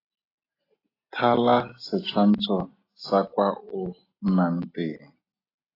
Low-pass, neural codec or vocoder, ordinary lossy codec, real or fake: 5.4 kHz; none; AAC, 24 kbps; real